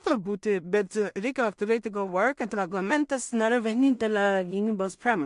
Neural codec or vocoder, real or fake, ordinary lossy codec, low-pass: codec, 16 kHz in and 24 kHz out, 0.4 kbps, LongCat-Audio-Codec, two codebook decoder; fake; MP3, 64 kbps; 10.8 kHz